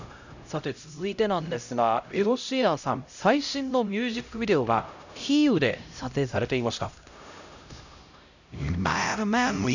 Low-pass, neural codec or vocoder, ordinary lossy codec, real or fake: 7.2 kHz; codec, 16 kHz, 0.5 kbps, X-Codec, HuBERT features, trained on LibriSpeech; none; fake